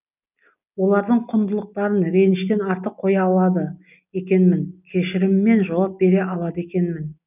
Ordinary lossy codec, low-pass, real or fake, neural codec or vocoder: none; 3.6 kHz; real; none